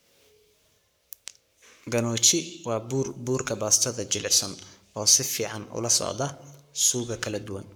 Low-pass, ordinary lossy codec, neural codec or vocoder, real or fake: none; none; codec, 44.1 kHz, 7.8 kbps, Pupu-Codec; fake